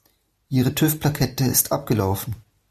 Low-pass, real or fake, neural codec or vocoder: 14.4 kHz; real; none